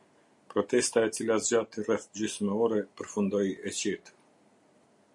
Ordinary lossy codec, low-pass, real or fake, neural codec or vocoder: MP3, 48 kbps; 10.8 kHz; real; none